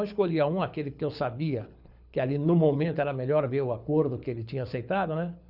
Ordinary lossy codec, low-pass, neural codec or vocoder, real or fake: none; 5.4 kHz; codec, 24 kHz, 6 kbps, HILCodec; fake